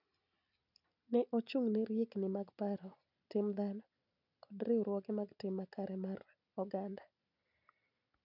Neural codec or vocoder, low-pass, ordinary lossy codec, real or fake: none; 5.4 kHz; none; real